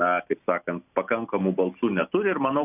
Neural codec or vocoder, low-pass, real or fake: none; 3.6 kHz; real